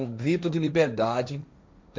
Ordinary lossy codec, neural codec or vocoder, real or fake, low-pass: none; codec, 16 kHz, 1.1 kbps, Voila-Tokenizer; fake; none